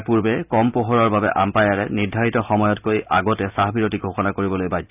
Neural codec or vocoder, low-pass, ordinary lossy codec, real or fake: none; 3.6 kHz; none; real